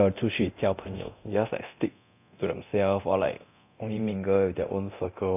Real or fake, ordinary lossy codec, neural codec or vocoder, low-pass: fake; none; codec, 24 kHz, 0.9 kbps, DualCodec; 3.6 kHz